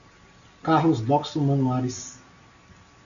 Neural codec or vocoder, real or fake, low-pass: none; real; 7.2 kHz